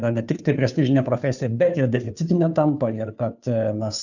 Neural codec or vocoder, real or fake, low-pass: codec, 16 kHz, 2 kbps, FunCodec, trained on Chinese and English, 25 frames a second; fake; 7.2 kHz